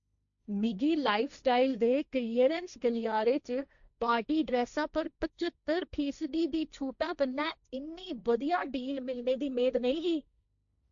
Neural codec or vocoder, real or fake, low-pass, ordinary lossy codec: codec, 16 kHz, 1.1 kbps, Voila-Tokenizer; fake; 7.2 kHz; none